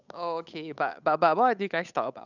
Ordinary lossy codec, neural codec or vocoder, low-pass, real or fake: none; codec, 16 kHz, 8 kbps, FunCodec, trained on Chinese and English, 25 frames a second; 7.2 kHz; fake